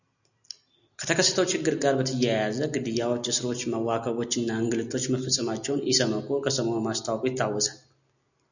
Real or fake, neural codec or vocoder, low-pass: real; none; 7.2 kHz